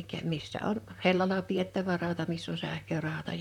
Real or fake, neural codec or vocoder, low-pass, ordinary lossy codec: fake; vocoder, 44.1 kHz, 128 mel bands, Pupu-Vocoder; 19.8 kHz; none